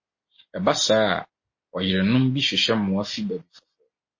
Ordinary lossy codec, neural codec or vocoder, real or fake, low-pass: MP3, 32 kbps; none; real; 7.2 kHz